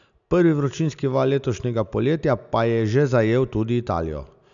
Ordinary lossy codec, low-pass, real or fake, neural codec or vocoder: none; 7.2 kHz; real; none